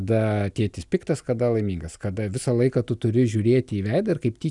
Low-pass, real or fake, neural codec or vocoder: 10.8 kHz; real; none